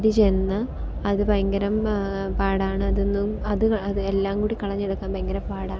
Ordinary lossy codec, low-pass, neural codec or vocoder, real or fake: none; none; none; real